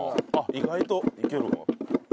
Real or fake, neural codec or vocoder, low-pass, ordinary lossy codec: real; none; none; none